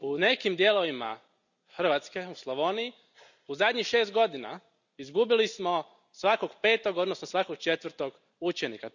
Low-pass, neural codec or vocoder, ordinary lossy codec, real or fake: 7.2 kHz; none; none; real